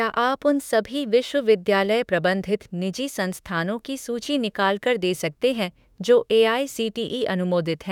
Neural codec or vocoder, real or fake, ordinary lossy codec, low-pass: autoencoder, 48 kHz, 32 numbers a frame, DAC-VAE, trained on Japanese speech; fake; none; 19.8 kHz